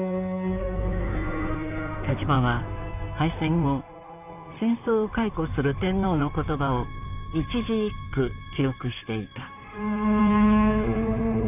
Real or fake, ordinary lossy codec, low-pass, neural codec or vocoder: fake; none; 3.6 kHz; codec, 16 kHz in and 24 kHz out, 2.2 kbps, FireRedTTS-2 codec